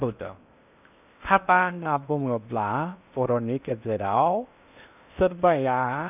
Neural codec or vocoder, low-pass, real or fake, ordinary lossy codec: codec, 16 kHz in and 24 kHz out, 0.6 kbps, FocalCodec, streaming, 4096 codes; 3.6 kHz; fake; none